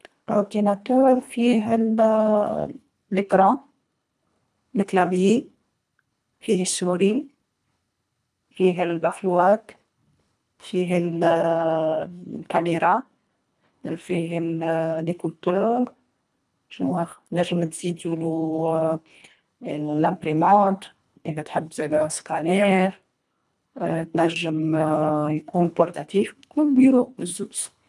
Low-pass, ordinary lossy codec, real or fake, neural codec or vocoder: none; none; fake; codec, 24 kHz, 1.5 kbps, HILCodec